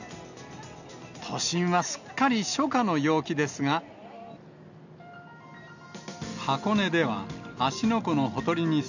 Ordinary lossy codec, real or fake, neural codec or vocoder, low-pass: none; real; none; 7.2 kHz